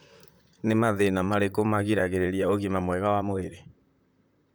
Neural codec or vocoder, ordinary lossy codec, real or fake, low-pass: vocoder, 44.1 kHz, 128 mel bands, Pupu-Vocoder; none; fake; none